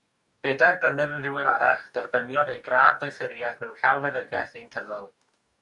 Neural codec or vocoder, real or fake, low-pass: codec, 44.1 kHz, 2.6 kbps, DAC; fake; 10.8 kHz